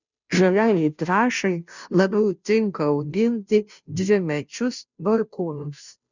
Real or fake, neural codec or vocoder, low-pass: fake; codec, 16 kHz, 0.5 kbps, FunCodec, trained on Chinese and English, 25 frames a second; 7.2 kHz